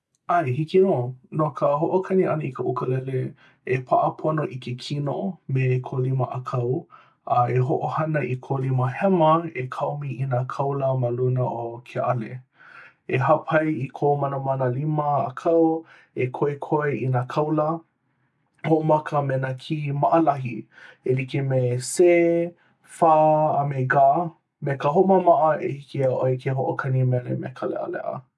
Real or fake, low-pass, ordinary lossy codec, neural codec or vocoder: real; none; none; none